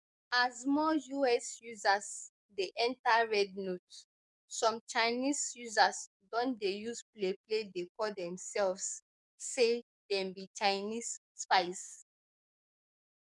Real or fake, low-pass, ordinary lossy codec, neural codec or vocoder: fake; 10.8 kHz; none; codec, 44.1 kHz, 7.8 kbps, DAC